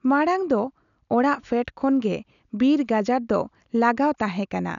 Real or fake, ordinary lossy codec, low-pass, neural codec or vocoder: real; none; 7.2 kHz; none